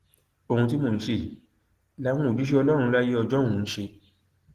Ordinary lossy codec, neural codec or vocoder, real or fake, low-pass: Opus, 16 kbps; none; real; 14.4 kHz